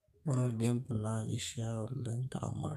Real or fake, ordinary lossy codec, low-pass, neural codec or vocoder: fake; MP3, 96 kbps; 14.4 kHz; codec, 32 kHz, 1.9 kbps, SNAC